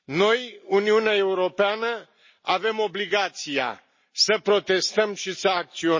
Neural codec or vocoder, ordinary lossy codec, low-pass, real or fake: none; MP3, 32 kbps; 7.2 kHz; real